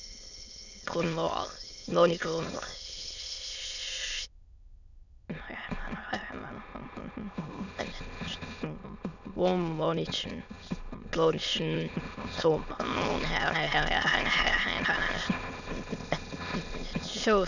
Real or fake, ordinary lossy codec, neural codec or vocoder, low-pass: fake; none; autoencoder, 22.05 kHz, a latent of 192 numbers a frame, VITS, trained on many speakers; 7.2 kHz